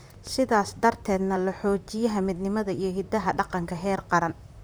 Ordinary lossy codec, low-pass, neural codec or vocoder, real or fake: none; none; vocoder, 44.1 kHz, 128 mel bands, Pupu-Vocoder; fake